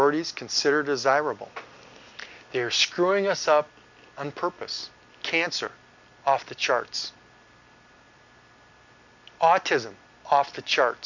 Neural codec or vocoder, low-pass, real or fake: none; 7.2 kHz; real